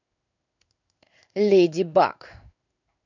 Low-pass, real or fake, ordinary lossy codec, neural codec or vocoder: 7.2 kHz; fake; none; codec, 16 kHz in and 24 kHz out, 1 kbps, XY-Tokenizer